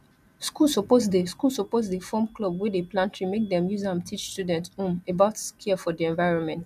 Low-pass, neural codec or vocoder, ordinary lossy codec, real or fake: 14.4 kHz; none; none; real